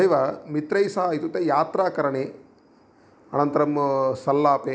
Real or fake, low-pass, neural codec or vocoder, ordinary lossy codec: real; none; none; none